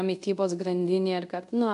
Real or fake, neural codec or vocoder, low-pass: fake; codec, 24 kHz, 0.5 kbps, DualCodec; 10.8 kHz